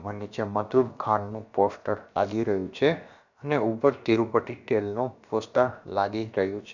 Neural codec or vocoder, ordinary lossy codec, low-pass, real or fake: codec, 16 kHz, about 1 kbps, DyCAST, with the encoder's durations; none; 7.2 kHz; fake